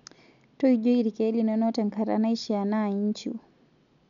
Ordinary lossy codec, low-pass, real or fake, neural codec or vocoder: none; 7.2 kHz; real; none